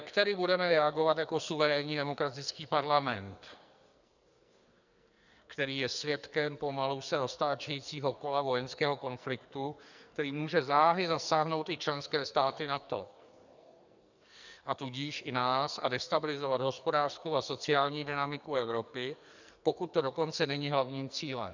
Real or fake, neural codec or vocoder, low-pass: fake; codec, 44.1 kHz, 2.6 kbps, SNAC; 7.2 kHz